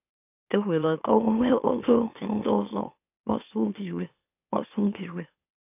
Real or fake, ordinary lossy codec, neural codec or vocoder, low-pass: fake; none; autoencoder, 44.1 kHz, a latent of 192 numbers a frame, MeloTTS; 3.6 kHz